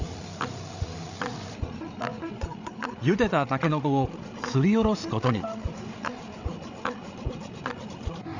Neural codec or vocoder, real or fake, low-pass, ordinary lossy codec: codec, 16 kHz, 8 kbps, FreqCodec, larger model; fake; 7.2 kHz; none